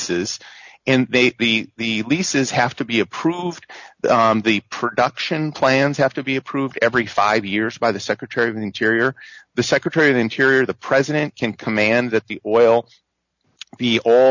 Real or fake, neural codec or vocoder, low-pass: real; none; 7.2 kHz